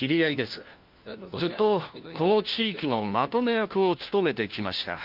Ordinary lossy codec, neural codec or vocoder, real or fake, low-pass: Opus, 32 kbps; codec, 16 kHz, 1 kbps, FunCodec, trained on LibriTTS, 50 frames a second; fake; 5.4 kHz